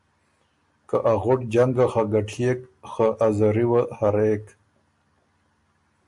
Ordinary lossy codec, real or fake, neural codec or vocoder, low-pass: MP3, 64 kbps; real; none; 10.8 kHz